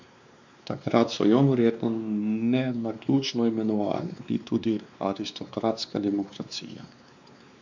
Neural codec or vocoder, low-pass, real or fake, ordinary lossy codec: codec, 16 kHz, 2 kbps, X-Codec, WavLM features, trained on Multilingual LibriSpeech; 7.2 kHz; fake; MP3, 64 kbps